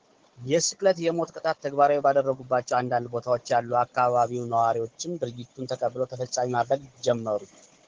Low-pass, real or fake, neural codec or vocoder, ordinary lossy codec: 7.2 kHz; fake; codec, 16 kHz, 16 kbps, FunCodec, trained on Chinese and English, 50 frames a second; Opus, 16 kbps